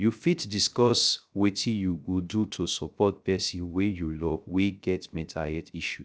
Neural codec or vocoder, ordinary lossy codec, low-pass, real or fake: codec, 16 kHz, 0.3 kbps, FocalCodec; none; none; fake